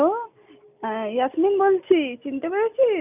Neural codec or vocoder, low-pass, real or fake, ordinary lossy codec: none; 3.6 kHz; real; none